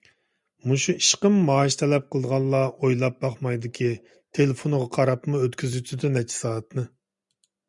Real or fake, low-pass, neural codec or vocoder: real; 10.8 kHz; none